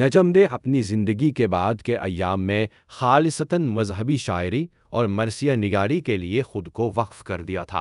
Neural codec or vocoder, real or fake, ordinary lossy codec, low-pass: codec, 24 kHz, 0.5 kbps, DualCodec; fake; none; 10.8 kHz